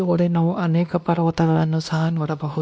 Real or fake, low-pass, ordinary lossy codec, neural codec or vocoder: fake; none; none; codec, 16 kHz, 1 kbps, X-Codec, WavLM features, trained on Multilingual LibriSpeech